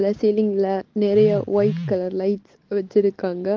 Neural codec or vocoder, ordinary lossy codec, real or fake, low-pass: none; Opus, 16 kbps; real; 7.2 kHz